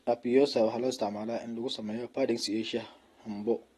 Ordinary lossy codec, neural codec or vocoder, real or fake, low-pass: AAC, 32 kbps; none; real; 19.8 kHz